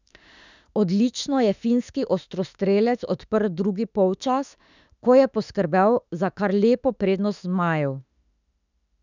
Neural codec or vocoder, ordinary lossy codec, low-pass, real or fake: autoencoder, 48 kHz, 32 numbers a frame, DAC-VAE, trained on Japanese speech; none; 7.2 kHz; fake